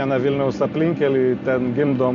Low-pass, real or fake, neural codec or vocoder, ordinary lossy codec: 7.2 kHz; real; none; MP3, 48 kbps